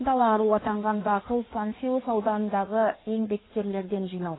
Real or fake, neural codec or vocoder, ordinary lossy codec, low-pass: fake; codec, 16 kHz in and 24 kHz out, 1.1 kbps, FireRedTTS-2 codec; AAC, 16 kbps; 7.2 kHz